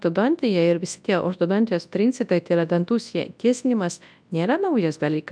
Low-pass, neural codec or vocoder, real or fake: 9.9 kHz; codec, 24 kHz, 0.9 kbps, WavTokenizer, large speech release; fake